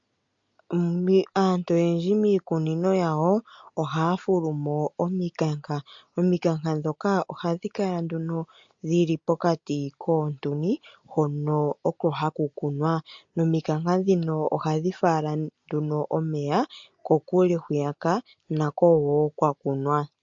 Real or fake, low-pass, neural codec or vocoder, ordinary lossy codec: real; 7.2 kHz; none; MP3, 48 kbps